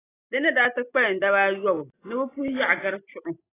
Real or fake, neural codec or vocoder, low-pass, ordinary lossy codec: real; none; 3.6 kHz; AAC, 16 kbps